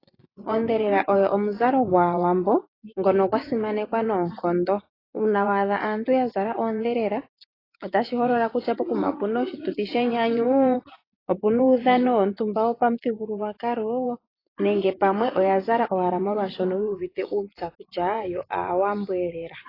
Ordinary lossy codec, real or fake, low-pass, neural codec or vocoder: AAC, 24 kbps; fake; 5.4 kHz; vocoder, 24 kHz, 100 mel bands, Vocos